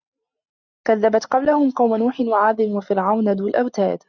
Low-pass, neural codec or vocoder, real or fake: 7.2 kHz; none; real